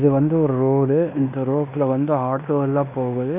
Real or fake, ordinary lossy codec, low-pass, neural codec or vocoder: fake; none; 3.6 kHz; codec, 16 kHz in and 24 kHz out, 0.9 kbps, LongCat-Audio-Codec, fine tuned four codebook decoder